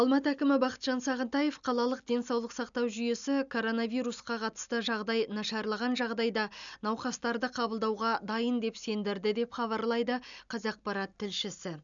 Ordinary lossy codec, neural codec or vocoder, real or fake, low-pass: none; none; real; 7.2 kHz